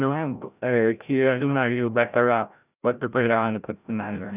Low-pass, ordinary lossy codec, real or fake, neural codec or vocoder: 3.6 kHz; none; fake; codec, 16 kHz, 0.5 kbps, FreqCodec, larger model